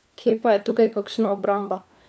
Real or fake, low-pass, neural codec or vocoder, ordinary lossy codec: fake; none; codec, 16 kHz, 4 kbps, FunCodec, trained on LibriTTS, 50 frames a second; none